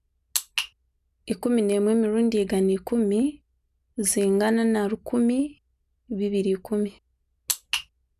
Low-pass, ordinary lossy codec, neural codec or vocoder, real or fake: 14.4 kHz; none; none; real